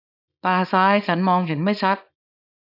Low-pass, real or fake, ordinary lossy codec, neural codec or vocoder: 5.4 kHz; fake; none; codec, 24 kHz, 0.9 kbps, WavTokenizer, small release